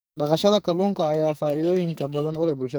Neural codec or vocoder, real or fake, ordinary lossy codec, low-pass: codec, 44.1 kHz, 2.6 kbps, SNAC; fake; none; none